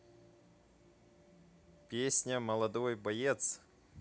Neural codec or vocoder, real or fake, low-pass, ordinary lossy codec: none; real; none; none